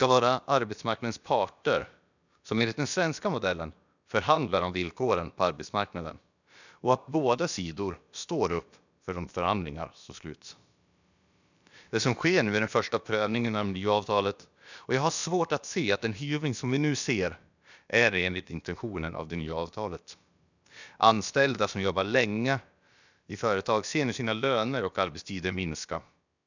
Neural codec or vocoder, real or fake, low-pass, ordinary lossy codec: codec, 16 kHz, about 1 kbps, DyCAST, with the encoder's durations; fake; 7.2 kHz; none